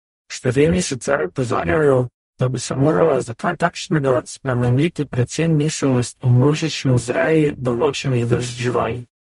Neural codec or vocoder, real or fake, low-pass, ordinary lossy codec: codec, 44.1 kHz, 0.9 kbps, DAC; fake; 19.8 kHz; MP3, 48 kbps